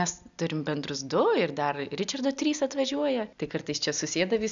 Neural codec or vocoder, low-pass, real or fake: none; 7.2 kHz; real